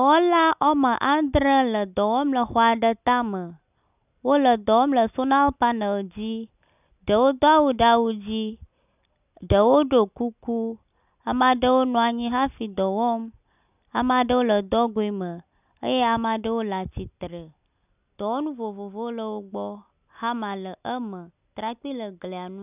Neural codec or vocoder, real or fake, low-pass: none; real; 3.6 kHz